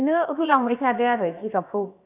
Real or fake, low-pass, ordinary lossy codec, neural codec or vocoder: fake; 3.6 kHz; none; codec, 16 kHz, about 1 kbps, DyCAST, with the encoder's durations